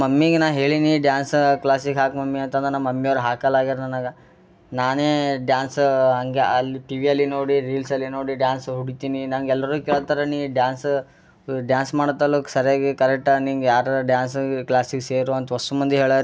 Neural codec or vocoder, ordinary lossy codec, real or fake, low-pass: none; none; real; none